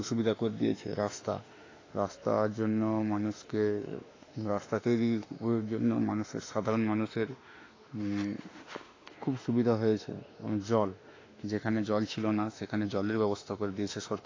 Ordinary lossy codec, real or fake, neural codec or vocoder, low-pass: AAC, 32 kbps; fake; autoencoder, 48 kHz, 32 numbers a frame, DAC-VAE, trained on Japanese speech; 7.2 kHz